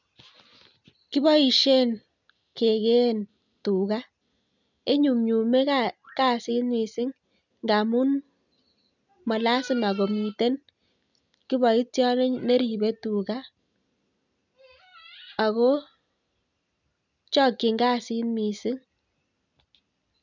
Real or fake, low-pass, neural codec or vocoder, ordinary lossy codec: real; 7.2 kHz; none; none